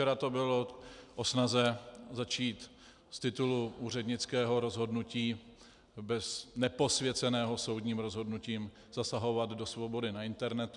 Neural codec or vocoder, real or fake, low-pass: none; real; 10.8 kHz